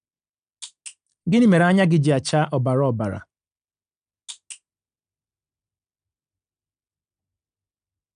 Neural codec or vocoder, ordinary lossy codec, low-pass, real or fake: none; none; 9.9 kHz; real